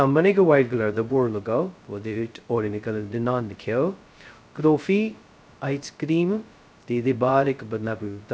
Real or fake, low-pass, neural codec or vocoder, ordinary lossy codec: fake; none; codec, 16 kHz, 0.2 kbps, FocalCodec; none